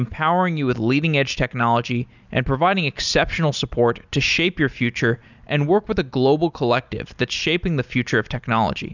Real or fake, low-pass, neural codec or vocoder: real; 7.2 kHz; none